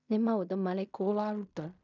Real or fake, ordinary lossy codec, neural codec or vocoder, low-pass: fake; none; codec, 16 kHz in and 24 kHz out, 0.4 kbps, LongCat-Audio-Codec, fine tuned four codebook decoder; 7.2 kHz